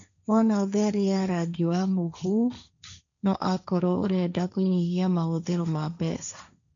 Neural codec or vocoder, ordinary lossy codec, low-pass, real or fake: codec, 16 kHz, 1.1 kbps, Voila-Tokenizer; none; 7.2 kHz; fake